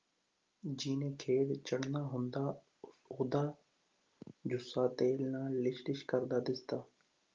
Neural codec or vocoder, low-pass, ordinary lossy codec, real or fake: none; 7.2 kHz; Opus, 32 kbps; real